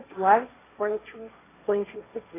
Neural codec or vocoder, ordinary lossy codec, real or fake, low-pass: codec, 16 kHz, 1.1 kbps, Voila-Tokenizer; AAC, 16 kbps; fake; 3.6 kHz